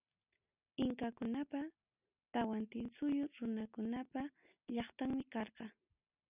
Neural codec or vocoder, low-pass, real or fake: none; 3.6 kHz; real